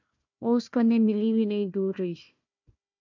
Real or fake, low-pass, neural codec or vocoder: fake; 7.2 kHz; codec, 16 kHz, 1 kbps, FunCodec, trained on Chinese and English, 50 frames a second